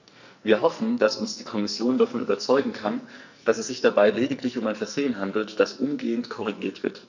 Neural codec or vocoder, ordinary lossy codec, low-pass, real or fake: codec, 44.1 kHz, 2.6 kbps, SNAC; none; 7.2 kHz; fake